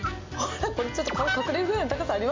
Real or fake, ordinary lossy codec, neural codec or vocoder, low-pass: real; MP3, 48 kbps; none; 7.2 kHz